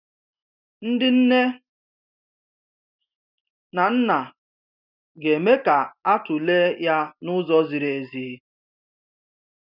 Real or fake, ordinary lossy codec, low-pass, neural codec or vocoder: real; none; 5.4 kHz; none